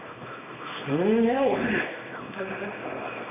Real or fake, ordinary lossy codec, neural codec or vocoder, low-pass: fake; AAC, 24 kbps; codec, 24 kHz, 0.9 kbps, WavTokenizer, small release; 3.6 kHz